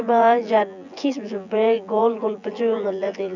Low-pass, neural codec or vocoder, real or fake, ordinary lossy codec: 7.2 kHz; vocoder, 24 kHz, 100 mel bands, Vocos; fake; none